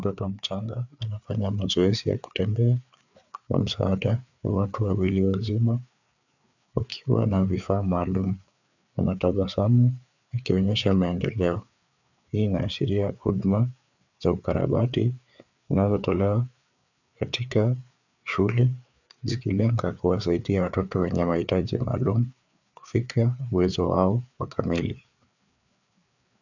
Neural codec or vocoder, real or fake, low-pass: codec, 16 kHz, 4 kbps, FreqCodec, larger model; fake; 7.2 kHz